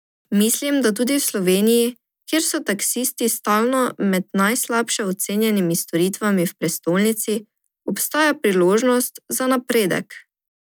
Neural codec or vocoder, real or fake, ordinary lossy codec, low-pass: none; real; none; none